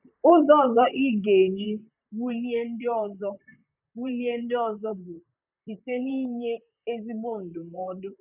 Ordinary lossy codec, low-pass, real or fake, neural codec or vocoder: none; 3.6 kHz; fake; vocoder, 44.1 kHz, 128 mel bands, Pupu-Vocoder